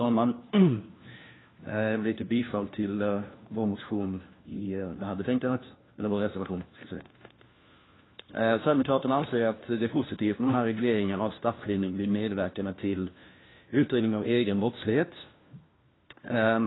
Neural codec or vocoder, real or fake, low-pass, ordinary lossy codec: codec, 16 kHz, 1 kbps, FunCodec, trained on LibriTTS, 50 frames a second; fake; 7.2 kHz; AAC, 16 kbps